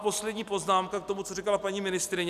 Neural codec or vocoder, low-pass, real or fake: none; 14.4 kHz; real